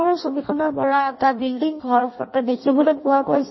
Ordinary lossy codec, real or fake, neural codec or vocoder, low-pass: MP3, 24 kbps; fake; codec, 16 kHz in and 24 kHz out, 0.6 kbps, FireRedTTS-2 codec; 7.2 kHz